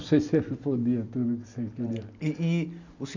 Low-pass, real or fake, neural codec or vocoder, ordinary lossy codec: 7.2 kHz; real; none; none